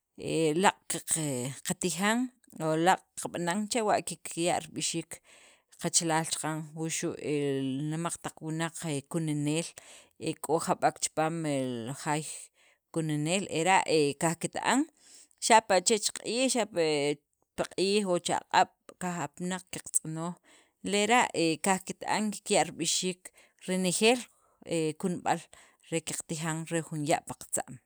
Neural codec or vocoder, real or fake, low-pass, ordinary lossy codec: none; real; none; none